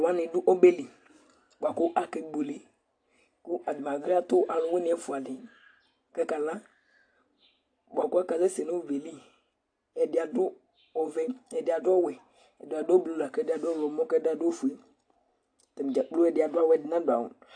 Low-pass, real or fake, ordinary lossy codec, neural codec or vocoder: 9.9 kHz; real; AAC, 48 kbps; none